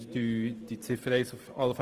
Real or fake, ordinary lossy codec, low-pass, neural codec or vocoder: fake; Opus, 24 kbps; 14.4 kHz; vocoder, 44.1 kHz, 128 mel bands every 512 samples, BigVGAN v2